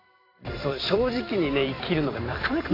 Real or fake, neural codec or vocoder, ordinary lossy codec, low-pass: fake; vocoder, 44.1 kHz, 128 mel bands every 256 samples, BigVGAN v2; AAC, 24 kbps; 5.4 kHz